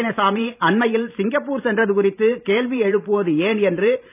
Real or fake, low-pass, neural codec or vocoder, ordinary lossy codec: fake; 3.6 kHz; vocoder, 44.1 kHz, 128 mel bands every 512 samples, BigVGAN v2; none